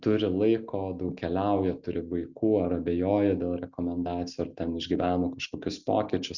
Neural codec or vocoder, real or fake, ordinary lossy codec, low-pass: none; real; Opus, 64 kbps; 7.2 kHz